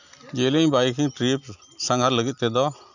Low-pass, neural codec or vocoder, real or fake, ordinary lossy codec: 7.2 kHz; none; real; none